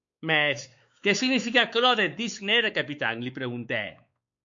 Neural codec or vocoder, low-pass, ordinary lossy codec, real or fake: codec, 16 kHz, 4 kbps, X-Codec, WavLM features, trained on Multilingual LibriSpeech; 7.2 kHz; MP3, 64 kbps; fake